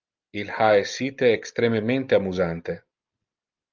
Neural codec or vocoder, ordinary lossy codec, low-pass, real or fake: none; Opus, 24 kbps; 7.2 kHz; real